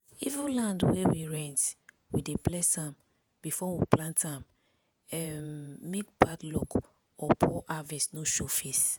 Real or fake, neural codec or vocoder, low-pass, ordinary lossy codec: fake; vocoder, 48 kHz, 128 mel bands, Vocos; none; none